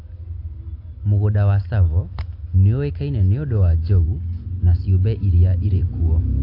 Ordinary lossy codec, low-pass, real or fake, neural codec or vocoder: AAC, 32 kbps; 5.4 kHz; real; none